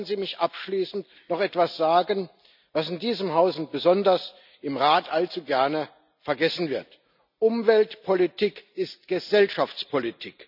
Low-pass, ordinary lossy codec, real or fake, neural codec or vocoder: 5.4 kHz; none; real; none